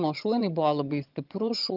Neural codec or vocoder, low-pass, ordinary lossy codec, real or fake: vocoder, 22.05 kHz, 80 mel bands, HiFi-GAN; 5.4 kHz; Opus, 24 kbps; fake